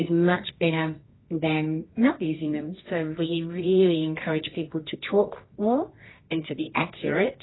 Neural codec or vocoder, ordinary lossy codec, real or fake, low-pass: codec, 24 kHz, 0.9 kbps, WavTokenizer, medium music audio release; AAC, 16 kbps; fake; 7.2 kHz